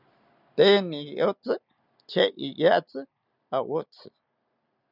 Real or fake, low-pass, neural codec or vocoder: real; 5.4 kHz; none